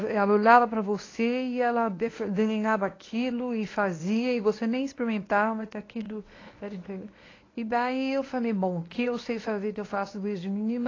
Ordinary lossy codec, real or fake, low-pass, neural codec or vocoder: AAC, 32 kbps; fake; 7.2 kHz; codec, 24 kHz, 0.9 kbps, WavTokenizer, medium speech release version 1